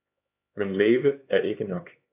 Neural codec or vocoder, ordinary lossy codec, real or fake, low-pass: codec, 16 kHz, 4 kbps, X-Codec, HuBERT features, trained on LibriSpeech; AAC, 32 kbps; fake; 3.6 kHz